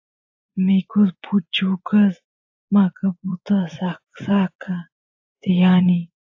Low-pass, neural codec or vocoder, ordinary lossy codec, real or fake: 7.2 kHz; vocoder, 24 kHz, 100 mel bands, Vocos; AAC, 48 kbps; fake